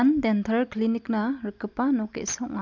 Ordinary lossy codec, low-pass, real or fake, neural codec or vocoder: MP3, 64 kbps; 7.2 kHz; real; none